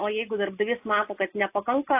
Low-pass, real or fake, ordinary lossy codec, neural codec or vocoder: 3.6 kHz; real; AAC, 24 kbps; none